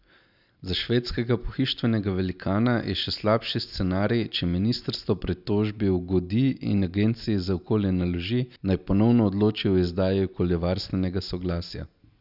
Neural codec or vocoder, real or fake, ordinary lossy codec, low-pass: none; real; none; 5.4 kHz